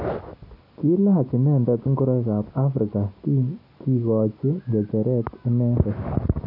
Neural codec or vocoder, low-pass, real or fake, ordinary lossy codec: none; 5.4 kHz; real; AAC, 32 kbps